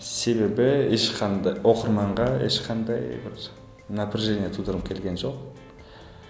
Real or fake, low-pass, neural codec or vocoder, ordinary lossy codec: real; none; none; none